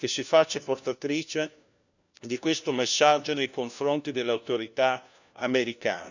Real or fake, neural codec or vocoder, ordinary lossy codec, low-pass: fake; codec, 16 kHz, 1 kbps, FunCodec, trained on LibriTTS, 50 frames a second; none; 7.2 kHz